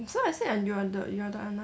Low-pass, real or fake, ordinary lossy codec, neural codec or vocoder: none; real; none; none